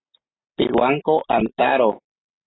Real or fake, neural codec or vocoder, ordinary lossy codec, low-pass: real; none; AAC, 16 kbps; 7.2 kHz